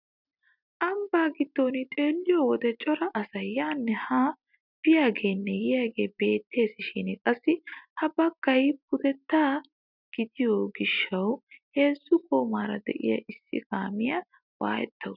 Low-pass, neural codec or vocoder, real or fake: 5.4 kHz; none; real